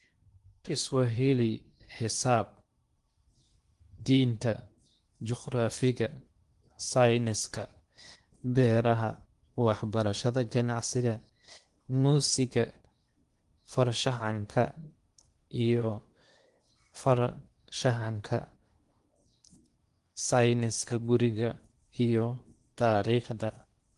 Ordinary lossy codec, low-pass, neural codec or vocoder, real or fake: Opus, 24 kbps; 10.8 kHz; codec, 16 kHz in and 24 kHz out, 0.8 kbps, FocalCodec, streaming, 65536 codes; fake